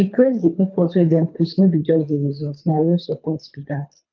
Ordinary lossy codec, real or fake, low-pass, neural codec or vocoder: none; fake; 7.2 kHz; codec, 24 kHz, 3 kbps, HILCodec